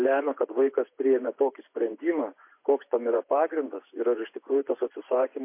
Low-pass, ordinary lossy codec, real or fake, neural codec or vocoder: 3.6 kHz; MP3, 24 kbps; fake; vocoder, 24 kHz, 100 mel bands, Vocos